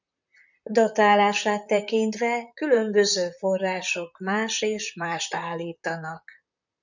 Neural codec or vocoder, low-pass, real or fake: vocoder, 44.1 kHz, 128 mel bands, Pupu-Vocoder; 7.2 kHz; fake